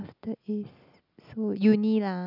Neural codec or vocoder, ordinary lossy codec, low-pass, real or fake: none; none; 5.4 kHz; real